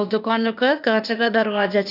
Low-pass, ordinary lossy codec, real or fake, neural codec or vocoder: 5.4 kHz; none; fake; codec, 16 kHz, 0.8 kbps, ZipCodec